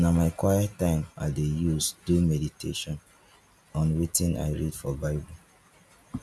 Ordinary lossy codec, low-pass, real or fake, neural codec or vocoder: none; none; real; none